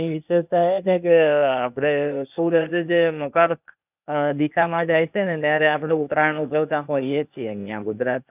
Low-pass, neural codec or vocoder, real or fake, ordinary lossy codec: 3.6 kHz; codec, 16 kHz, 0.8 kbps, ZipCodec; fake; none